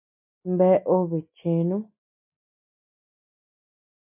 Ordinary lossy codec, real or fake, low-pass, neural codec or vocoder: MP3, 24 kbps; real; 3.6 kHz; none